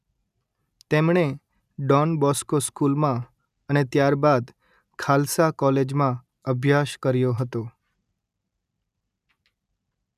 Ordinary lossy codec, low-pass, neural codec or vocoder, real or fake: none; 14.4 kHz; none; real